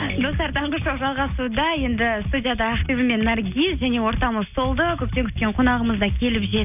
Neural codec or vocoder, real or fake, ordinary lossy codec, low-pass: none; real; none; 3.6 kHz